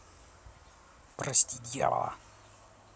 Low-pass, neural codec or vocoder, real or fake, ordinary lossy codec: none; none; real; none